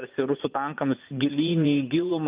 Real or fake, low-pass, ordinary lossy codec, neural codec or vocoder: fake; 3.6 kHz; Opus, 24 kbps; vocoder, 24 kHz, 100 mel bands, Vocos